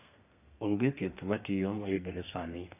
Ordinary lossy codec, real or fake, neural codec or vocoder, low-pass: AAC, 24 kbps; fake; codec, 32 kHz, 1.9 kbps, SNAC; 3.6 kHz